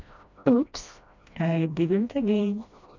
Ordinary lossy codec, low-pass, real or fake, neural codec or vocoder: none; 7.2 kHz; fake; codec, 16 kHz, 1 kbps, FreqCodec, smaller model